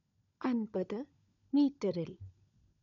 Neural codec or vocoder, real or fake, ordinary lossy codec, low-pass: codec, 16 kHz, 16 kbps, FunCodec, trained on LibriTTS, 50 frames a second; fake; none; 7.2 kHz